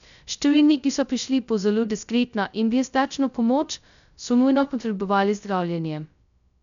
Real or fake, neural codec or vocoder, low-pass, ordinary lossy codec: fake; codec, 16 kHz, 0.2 kbps, FocalCodec; 7.2 kHz; none